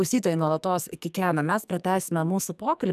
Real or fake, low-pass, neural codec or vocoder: fake; 14.4 kHz; codec, 44.1 kHz, 2.6 kbps, SNAC